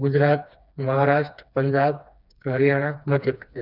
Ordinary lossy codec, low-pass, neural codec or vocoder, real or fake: none; 5.4 kHz; codec, 16 kHz, 2 kbps, FreqCodec, smaller model; fake